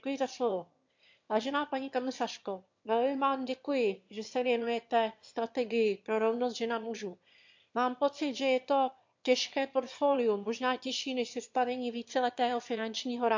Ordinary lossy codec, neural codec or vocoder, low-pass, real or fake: MP3, 48 kbps; autoencoder, 22.05 kHz, a latent of 192 numbers a frame, VITS, trained on one speaker; 7.2 kHz; fake